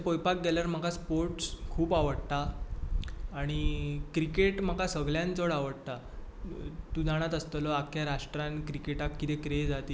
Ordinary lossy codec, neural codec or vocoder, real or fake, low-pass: none; none; real; none